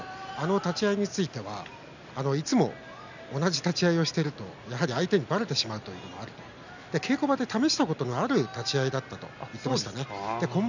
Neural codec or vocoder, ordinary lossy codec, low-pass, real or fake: none; none; 7.2 kHz; real